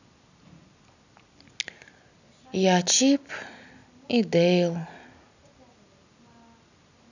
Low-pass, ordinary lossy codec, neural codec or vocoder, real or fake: 7.2 kHz; none; none; real